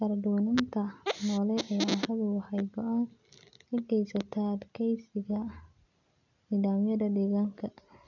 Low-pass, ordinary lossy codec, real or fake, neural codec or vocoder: 7.2 kHz; none; real; none